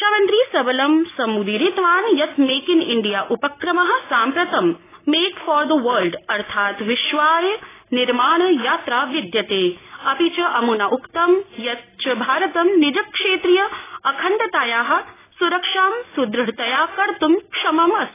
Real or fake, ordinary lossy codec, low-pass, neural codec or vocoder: real; AAC, 16 kbps; 3.6 kHz; none